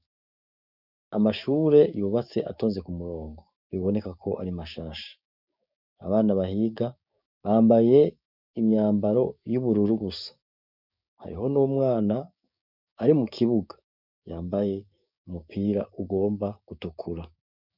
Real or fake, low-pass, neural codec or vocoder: fake; 5.4 kHz; codec, 16 kHz, 6 kbps, DAC